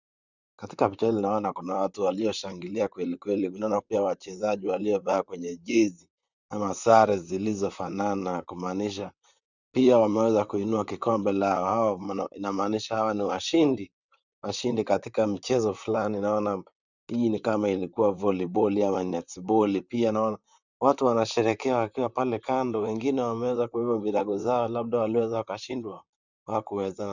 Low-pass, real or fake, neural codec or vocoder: 7.2 kHz; fake; vocoder, 44.1 kHz, 128 mel bands, Pupu-Vocoder